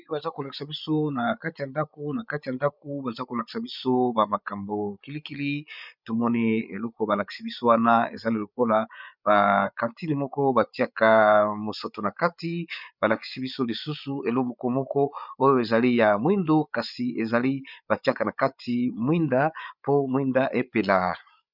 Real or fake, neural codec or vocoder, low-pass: fake; codec, 16 kHz, 8 kbps, FreqCodec, larger model; 5.4 kHz